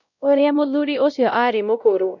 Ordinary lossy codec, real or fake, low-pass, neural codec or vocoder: none; fake; 7.2 kHz; codec, 16 kHz, 0.5 kbps, X-Codec, WavLM features, trained on Multilingual LibriSpeech